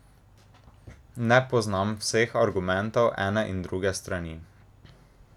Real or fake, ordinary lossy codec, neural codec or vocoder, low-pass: real; none; none; 19.8 kHz